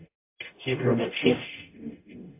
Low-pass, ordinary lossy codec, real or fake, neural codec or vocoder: 3.6 kHz; MP3, 32 kbps; fake; codec, 44.1 kHz, 0.9 kbps, DAC